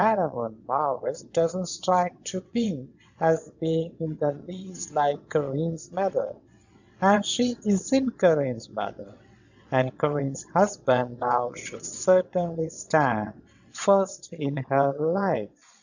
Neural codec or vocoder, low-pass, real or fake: vocoder, 22.05 kHz, 80 mel bands, WaveNeXt; 7.2 kHz; fake